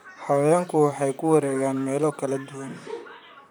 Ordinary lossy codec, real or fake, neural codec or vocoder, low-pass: none; fake; vocoder, 44.1 kHz, 128 mel bands, Pupu-Vocoder; none